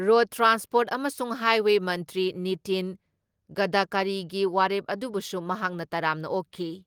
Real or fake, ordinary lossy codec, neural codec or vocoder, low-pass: real; Opus, 24 kbps; none; 19.8 kHz